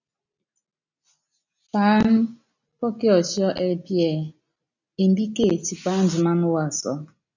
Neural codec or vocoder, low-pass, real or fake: none; 7.2 kHz; real